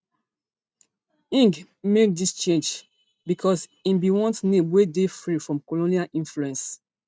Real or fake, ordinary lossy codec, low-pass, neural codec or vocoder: real; none; none; none